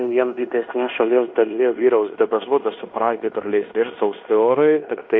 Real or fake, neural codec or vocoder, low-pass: fake; codec, 16 kHz in and 24 kHz out, 0.9 kbps, LongCat-Audio-Codec, fine tuned four codebook decoder; 7.2 kHz